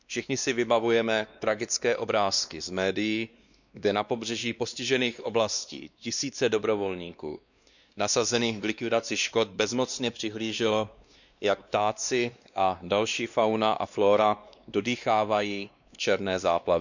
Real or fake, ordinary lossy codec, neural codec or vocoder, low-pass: fake; none; codec, 16 kHz, 2 kbps, X-Codec, WavLM features, trained on Multilingual LibriSpeech; 7.2 kHz